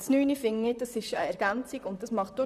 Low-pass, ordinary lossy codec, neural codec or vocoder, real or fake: 14.4 kHz; none; vocoder, 44.1 kHz, 128 mel bands, Pupu-Vocoder; fake